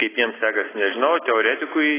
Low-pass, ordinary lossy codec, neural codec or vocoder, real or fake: 3.6 kHz; AAC, 16 kbps; none; real